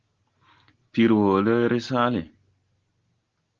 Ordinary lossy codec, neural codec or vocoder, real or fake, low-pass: Opus, 16 kbps; none; real; 7.2 kHz